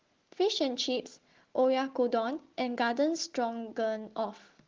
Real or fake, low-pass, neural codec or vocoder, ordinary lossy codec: real; 7.2 kHz; none; Opus, 16 kbps